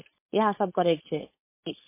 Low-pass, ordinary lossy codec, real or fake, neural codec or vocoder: 3.6 kHz; MP3, 24 kbps; fake; codec, 16 kHz, 4.8 kbps, FACodec